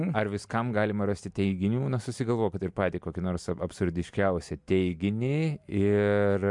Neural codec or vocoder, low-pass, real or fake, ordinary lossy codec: none; 10.8 kHz; real; MP3, 64 kbps